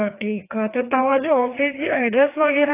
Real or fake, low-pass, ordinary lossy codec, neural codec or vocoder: fake; 3.6 kHz; AAC, 24 kbps; codec, 16 kHz, 2 kbps, FreqCodec, larger model